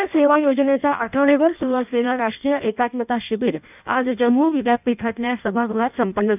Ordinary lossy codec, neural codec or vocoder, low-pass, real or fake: none; codec, 16 kHz in and 24 kHz out, 0.6 kbps, FireRedTTS-2 codec; 3.6 kHz; fake